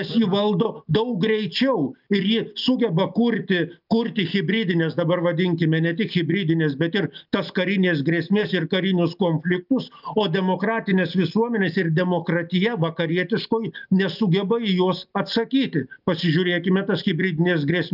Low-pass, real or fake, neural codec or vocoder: 5.4 kHz; real; none